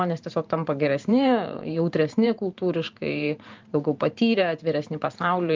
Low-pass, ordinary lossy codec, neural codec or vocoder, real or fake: 7.2 kHz; Opus, 24 kbps; vocoder, 44.1 kHz, 128 mel bands every 512 samples, BigVGAN v2; fake